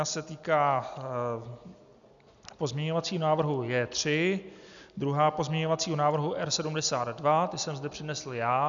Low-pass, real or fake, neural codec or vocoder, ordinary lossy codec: 7.2 kHz; real; none; MP3, 96 kbps